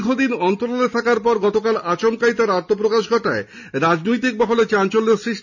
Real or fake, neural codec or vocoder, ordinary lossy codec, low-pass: real; none; none; 7.2 kHz